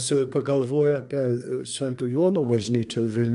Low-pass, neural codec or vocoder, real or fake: 10.8 kHz; codec, 24 kHz, 1 kbps, SNAC; fake